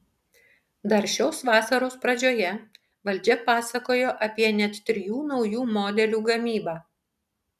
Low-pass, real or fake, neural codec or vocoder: 14.4 kHz; real; none